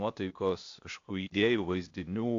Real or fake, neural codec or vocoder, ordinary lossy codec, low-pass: fake; codec, 16 kHz, 0.8 kbps, ZipCodec; AAC, 48 kbps; 7.2 kHz